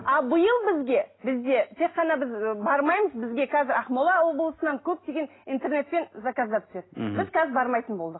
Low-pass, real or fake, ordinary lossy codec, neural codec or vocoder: 7.2 kHz; real; AAC, 16 kbps; none